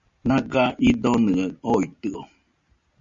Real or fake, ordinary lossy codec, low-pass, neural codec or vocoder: real; Opus, 64 kbps; 7.2 kHz; none